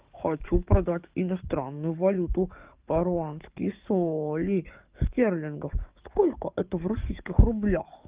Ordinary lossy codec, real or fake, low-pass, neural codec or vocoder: Opus, 32 kbps; fake; 3.6 kHz; codec, 44.1 kHz, 7.8 kbps, DAC